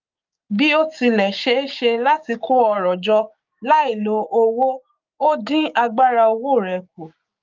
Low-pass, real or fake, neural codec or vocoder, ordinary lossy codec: 7.2 kHz; fake; codec, 16 kHz, 6 kbps, DAC; Opus, 24 kbps